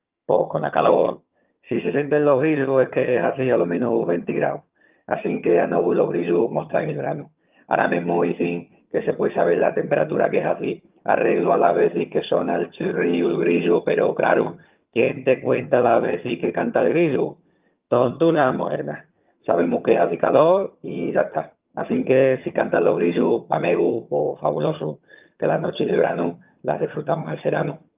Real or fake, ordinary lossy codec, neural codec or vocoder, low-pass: fake; Opus, 24 kbps; vocoder, 22.05 kHz, 80 mel bands, HiFi-GAN; 3.6 kHz